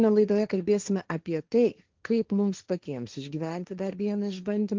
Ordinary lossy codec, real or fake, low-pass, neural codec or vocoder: Opus, 32 kbps; fake; 7.2 kHz; codec, 16 kHz, 1.1 kbps, Voila-Tokenizer